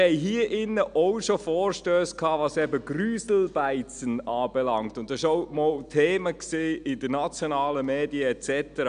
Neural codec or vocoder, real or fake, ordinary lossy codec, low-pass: none; real; none; 9.9 kHz